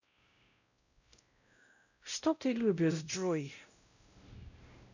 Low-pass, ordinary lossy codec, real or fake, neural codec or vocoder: 7.2 kHz; none; fake; codec, 16 kHz, 0.5 kbps, X-Codec, WavLM features, trained on Multilingual LibriSpeech